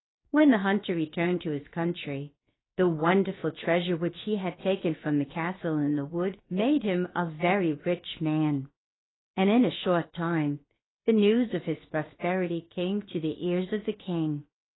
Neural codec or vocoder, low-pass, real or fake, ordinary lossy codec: codec, 16 kHz, 0.7 kbps, FocalCodec; 7.2 kHz; fake; AAC, 16 kbps